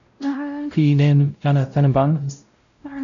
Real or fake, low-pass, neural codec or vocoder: fake; 7.2 kHz; codec, 16 kHz, 0.5 kbps, X-Codec, WavLM features, trained on Multilingual LibriSpeech